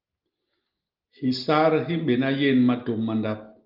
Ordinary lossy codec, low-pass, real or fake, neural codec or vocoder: Opus, 32 kbps; 5.4 kHz; real; none